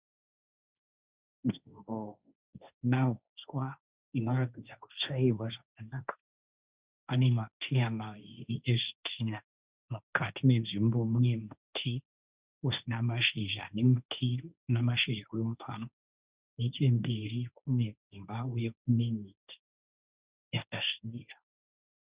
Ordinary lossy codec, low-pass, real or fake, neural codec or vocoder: Opus, 64 kbps; 3.6 kHz; fake; codec, 16 kHz, 1.1 kbps, Voila-Tokenizer